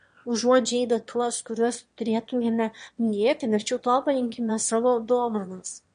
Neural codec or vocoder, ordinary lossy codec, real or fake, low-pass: autoencoder, 22.05 kHz, a latent of 192 numbers a frame, VITS, trained on one speaker; MP3, 48 kbps; fake; 9.9 kHz